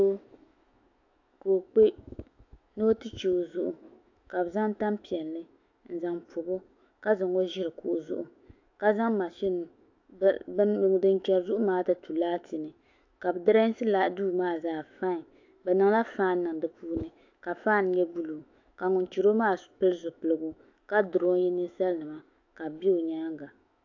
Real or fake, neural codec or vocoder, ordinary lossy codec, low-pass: fake; autoencoder, 48 kHz, 128 numbers a frame, DAC-VAE, trained on Japanese speech; AAC, 48 kbps; 7.2 kHz